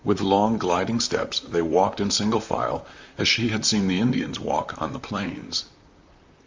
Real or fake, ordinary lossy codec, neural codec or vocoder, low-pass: fake; Opus, 32 kbps; vocoder, 44.1 kHz, 128 mel bands, Pupu-Vocoder; 7.2 kHz